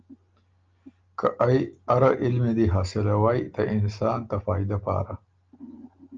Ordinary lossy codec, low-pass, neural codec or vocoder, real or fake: Opus, 24 kbps; 7.2 kHz; none; real